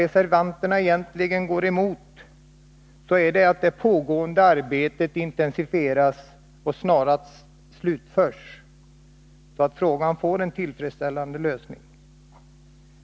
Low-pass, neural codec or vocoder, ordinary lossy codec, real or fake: none; none; none; real